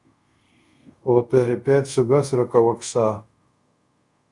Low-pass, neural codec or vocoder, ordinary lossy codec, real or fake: 10.8 kHz; codec, 24 kHz, 0.5 kbps, DualCodec; Opus, 64 kbps; fake